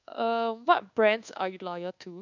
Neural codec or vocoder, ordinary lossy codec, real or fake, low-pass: codec, 24 kHz, 1.2 kbps, DualCodec; none; fake; 7.2 kHz